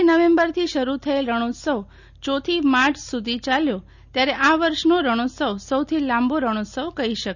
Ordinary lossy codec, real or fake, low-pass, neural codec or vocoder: none; real; 7.2 kHz; none